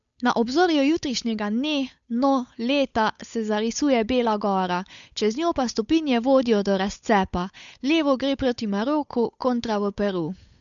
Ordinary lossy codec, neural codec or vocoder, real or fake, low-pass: none; codec, 16 kHz, 8 kbps, FunCodec, trained on Chinese and English, 25 frames a second; fake; 7.2 kHz